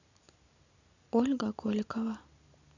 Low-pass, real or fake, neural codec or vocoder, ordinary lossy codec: 7.2 kHz; real; none; none